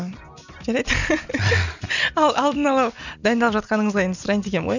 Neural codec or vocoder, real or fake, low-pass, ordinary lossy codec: none; real; 7.2 kHz; none